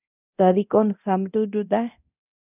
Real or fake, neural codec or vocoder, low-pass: fake; codec, 24 kHz, 0.9 kbps, DualCodec; 3.6 kHz